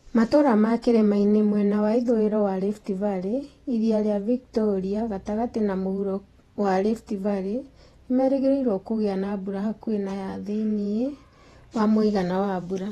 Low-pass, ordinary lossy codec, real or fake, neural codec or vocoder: 19.8 kHz; AAC, 32 kbps; fake; vocoder, 48 kHz, 128 mel bands, Vocos